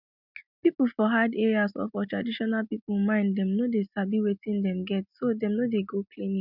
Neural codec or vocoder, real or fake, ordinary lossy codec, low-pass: none; real; none; 5.4 kHz